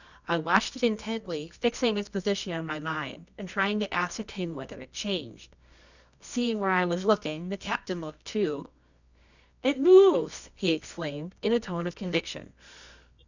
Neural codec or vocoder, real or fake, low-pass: codec, 24 kHz, 0.9 kbps, WavTokenizer, medium music audio release; fake; 7.2 kHz